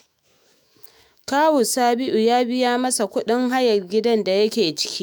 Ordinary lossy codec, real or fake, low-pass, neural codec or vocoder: none; fake; none; autoencoder, 48 kHz, 128 numbers a frame, DAC-VAE, trained on Japanese speech